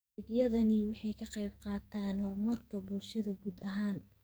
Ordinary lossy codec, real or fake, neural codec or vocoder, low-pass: none; fake; codec, 44.1 kHz, 2.6 kbps, SNAC; none